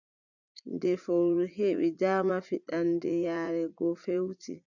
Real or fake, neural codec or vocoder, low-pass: fake; vocoder, 44.1 kHz, 80 mel bands, Vocos; 7.2 kHz